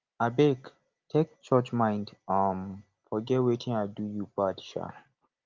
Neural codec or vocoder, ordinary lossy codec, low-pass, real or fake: none; Opus, 24 kbps; 7.2 kHz; real